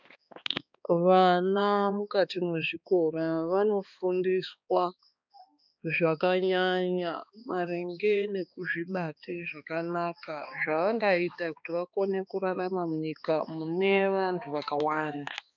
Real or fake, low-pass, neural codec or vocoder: fake; 7.2 kHz; codec, 16 kHz, 2 kbps, X-Codec, HuBERT features, trained on balanced general audio